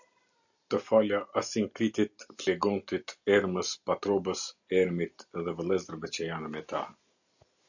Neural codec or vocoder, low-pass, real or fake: none; 7.2 kHz; real